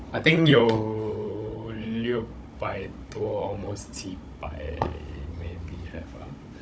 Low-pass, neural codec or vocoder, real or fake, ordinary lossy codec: none; codec, 16 kHz, 16 kbps, FunCodec, trained on Chinese and English, 50 frames a second; fake; none